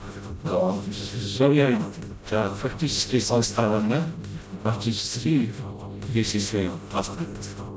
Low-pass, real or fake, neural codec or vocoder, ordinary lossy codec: none; fake; codec, 16 kHz, 0.5 kbps, FreqCodec, smaller model; none